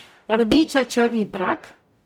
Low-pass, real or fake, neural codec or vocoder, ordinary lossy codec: 19.8 kHz; fake; codec, 44.1 kHz, 0.9 kbps, DAC; none